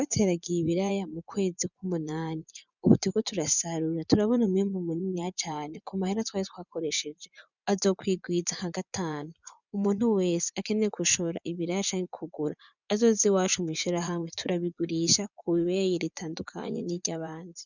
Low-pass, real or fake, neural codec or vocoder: 7.2 kHz; fake; vocoder, 24 kHz, 100 mel bands, Vocos